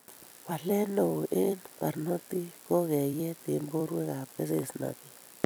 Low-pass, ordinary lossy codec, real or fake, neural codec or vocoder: none; none; fake; vocoder, 44.1 kHz, 128 mel bands every 256 samples, BigVGAN v2